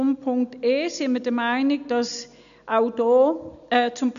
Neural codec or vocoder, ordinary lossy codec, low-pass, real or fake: none; none; 7.2 kHz; real